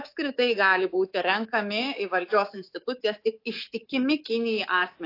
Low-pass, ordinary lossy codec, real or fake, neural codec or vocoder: 5.4 kHz; AAC, 32 kbps; fake; codec, 24 kHz, 3.1 kbps, DualCodec